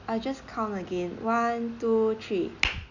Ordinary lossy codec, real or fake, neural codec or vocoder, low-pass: none; real; none; 7.2 kHz